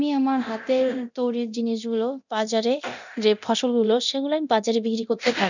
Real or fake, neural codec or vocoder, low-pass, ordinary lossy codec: fake; codec, 24 kHz, 0.9 kbps, DualCodec; 7.2 kHz; none